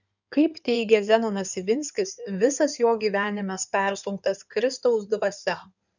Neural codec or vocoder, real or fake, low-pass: codec, 16 kHz in and 24 kHz out, 2.2 kbps, FireRedTTS-2 codec; fake; 7.2 kHz